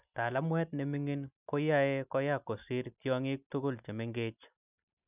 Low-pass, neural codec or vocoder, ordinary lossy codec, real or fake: 3.6 kHz; none; none; real